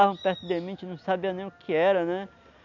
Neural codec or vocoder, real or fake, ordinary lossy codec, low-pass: none; real; none; 7.2 kHz